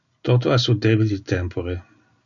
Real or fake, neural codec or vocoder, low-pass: real; none; 7.2 kHz